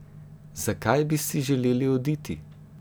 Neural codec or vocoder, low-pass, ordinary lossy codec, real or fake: none; none; none; real